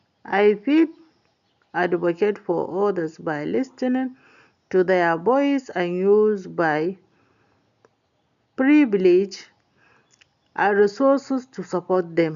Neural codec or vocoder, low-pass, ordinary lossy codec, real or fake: none; 7.2 kHz; none; real